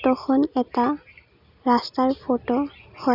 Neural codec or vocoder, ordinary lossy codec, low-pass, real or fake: none; none; 5.4 kHz; real